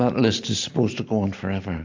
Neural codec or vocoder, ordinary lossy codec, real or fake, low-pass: none; AAC, 48 kbps; real; 7.2 kHz